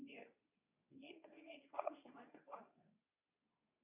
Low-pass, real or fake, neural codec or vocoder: 3.6 kHz; fake; codec, 24 kHz, 0.9 kbps, WavTokenizer, medium speech release version 2